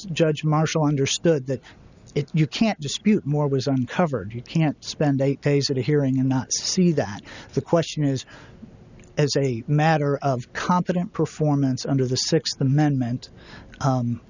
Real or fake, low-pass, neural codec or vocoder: real; 7.2 kHz; none